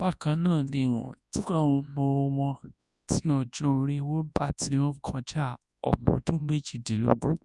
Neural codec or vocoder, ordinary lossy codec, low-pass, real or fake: codec, 24 kHz, 0.9 kbps, WavTokenizer, large speech release; none; 10.8 kHz; fake